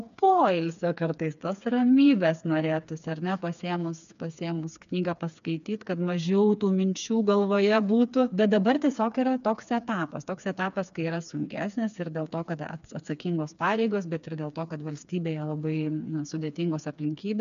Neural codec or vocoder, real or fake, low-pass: codec, 16 kHz, 4 kbps, FreqCodec, smaller model; fake; 7.2 kHz